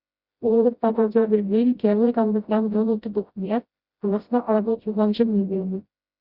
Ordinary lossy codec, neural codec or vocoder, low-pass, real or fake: Opus, 64 kbps; codec, 16 kHz, 0.5 kbps, FreqCodec, smaller model; 5.4 kHz; fake